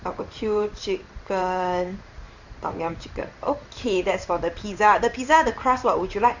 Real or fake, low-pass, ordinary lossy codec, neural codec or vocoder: fake; 7.2 kHz; Opus, 64 kbps; codec, 16 kHz in and 24 kHz out, 1 kbps, XY-Tokenizer